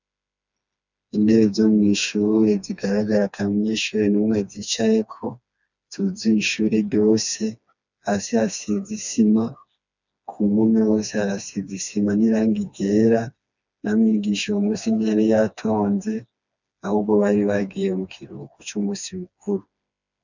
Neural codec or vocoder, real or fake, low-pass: codec, 16 kHz, 2 kbps, FreqCodec, smaller model; fake; 7.2 kHz